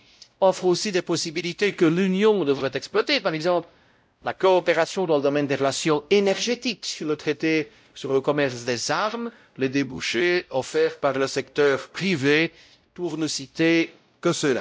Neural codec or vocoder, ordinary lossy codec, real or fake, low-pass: codec, 16 kHz, 0.5 kbps, X-Codec, WavLM features, trained on Multilingual LibriSpeech; none; fake; none